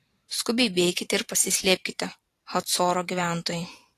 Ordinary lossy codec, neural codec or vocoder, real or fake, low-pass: AAC, 48 kbps; vocoder, 44.1 kHz, 128 mel bands every 512 samples, BigVGAN v2; fake; 14.4 kHz